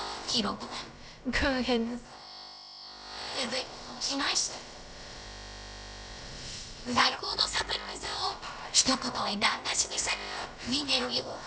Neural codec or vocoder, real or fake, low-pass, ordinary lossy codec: codec, 16 kHz, about 1 kbps, DyCAST, with the encoder's durations; fake; none; none